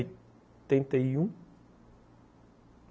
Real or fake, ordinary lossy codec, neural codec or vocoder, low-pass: real; none; none; none